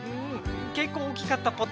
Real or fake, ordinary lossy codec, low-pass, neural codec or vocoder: real; none; none; none